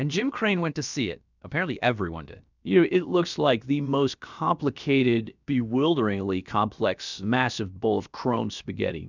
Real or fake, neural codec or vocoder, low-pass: fake; codec, 16 kHz, about 1 kbps, DyCAST, with the encoder's durations; 7.2 kHz